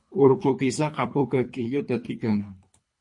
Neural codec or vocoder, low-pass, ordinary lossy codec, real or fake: codec, 24 kHz, 3 kbps, HILCodec; 10.8 kHz; MP3, 48 kbps; fake